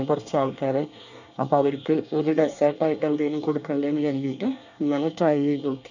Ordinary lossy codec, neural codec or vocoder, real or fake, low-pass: none; codec, 24 kHz, 1 kbps, SNAC; fake; 7.2 kHz